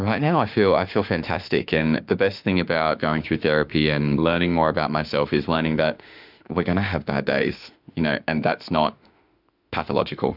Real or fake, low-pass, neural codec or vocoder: fake; 5.4 kHz; autoencoder, 48 kHz, 32 numbers a frame, DAC-VAE, trained on Japanese speech